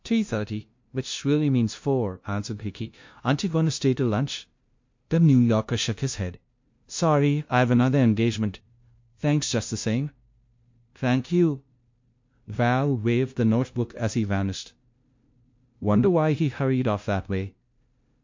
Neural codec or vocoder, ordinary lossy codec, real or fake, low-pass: codec, 16 kHz, 0.5 kbps, FunCodec, trained on LibriTTS, 25 frames a second; MP3, 48 kbps; fake; 7.2 kHz